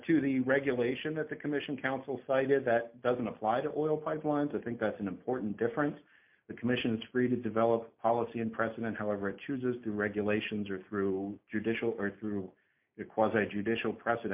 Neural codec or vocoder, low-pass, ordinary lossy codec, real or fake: none; 3.6 kHz; MP3, 32 kbps; real